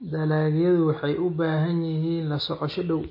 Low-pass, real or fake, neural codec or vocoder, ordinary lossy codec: 5.4 kHz; real; none; MP3, 24 kbps